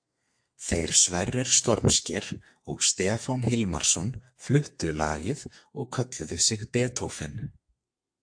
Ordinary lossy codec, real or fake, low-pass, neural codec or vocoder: AAC, 64 kbps; fake; 9.9 kHz; codec, 32 kHz, 1.9 kbps, SNAC